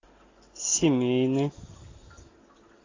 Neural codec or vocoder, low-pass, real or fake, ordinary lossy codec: none; 7.2 kHz; real; AAC, 32 kbps